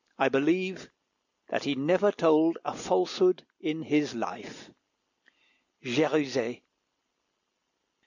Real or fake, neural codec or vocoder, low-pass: real; none; 7.2 kHz